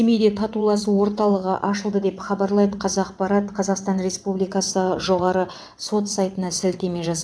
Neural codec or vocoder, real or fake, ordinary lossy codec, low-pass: vocoder, 22.05 kHz, 80 mel bands, WaveNeXt; fake; none; none